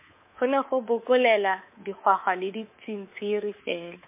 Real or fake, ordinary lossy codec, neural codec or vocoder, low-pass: fake; MP3, 24 kbps; codec, 24 kHz, 3.1 kbps, DualCodec; 3.6 kHz